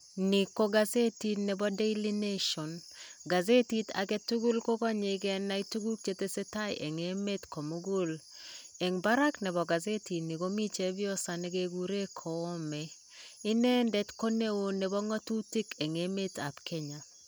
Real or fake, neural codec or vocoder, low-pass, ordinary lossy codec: real; none; none; none